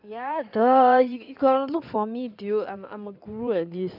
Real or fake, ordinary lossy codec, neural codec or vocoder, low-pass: fake; none; codec, 16 kHz in and 24 kHz out, 2.2 kbps, FireRedTTS-2 codec; 5.4 kHz